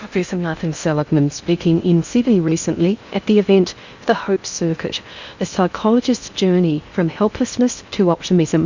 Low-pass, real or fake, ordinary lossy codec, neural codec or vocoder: 7.2 kHz; fake; Opus, 64 kbps; codec, 16 kHz in and 24 kHz out, 0.6 kbps, FocalCodec, streaming, 2048 codes